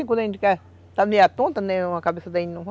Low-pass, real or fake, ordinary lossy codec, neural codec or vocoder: none; real; none; none